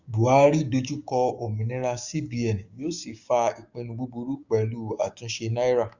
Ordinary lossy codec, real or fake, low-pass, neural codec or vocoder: Opus, 64 kbps; fake; 7.2 kHz; codec, 16 kHz, 6 kbps, DAC